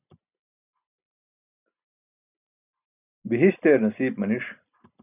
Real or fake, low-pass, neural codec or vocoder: real; 3.6 kHz; none